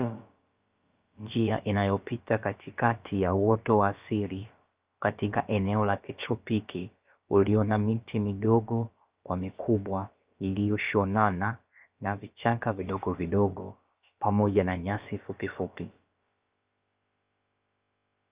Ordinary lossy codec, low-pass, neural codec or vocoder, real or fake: Opus, 24 kbps; 3.6 kHz; codec, 16 kHz, about 1 kbps, DyCAST, with the encoder's durations; fake